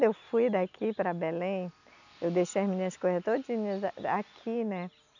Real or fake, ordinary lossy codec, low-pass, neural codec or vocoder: real; none; 7.2 kHz; none